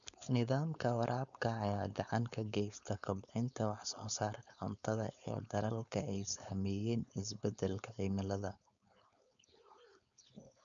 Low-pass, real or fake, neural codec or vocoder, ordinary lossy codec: 7.2 kHz; fake; codec, 16 kHz, 4.8 kbps, FACodec; none